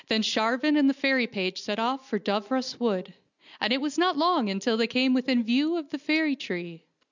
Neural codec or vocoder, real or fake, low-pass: none; real; 7.2 kHz